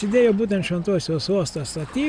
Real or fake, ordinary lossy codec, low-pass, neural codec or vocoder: real; Opus, 64 kbps; 9.9 kHz; none